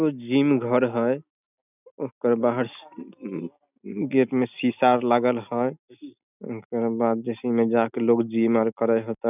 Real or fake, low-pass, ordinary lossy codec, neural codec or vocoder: real; 3.6 kHz; none; none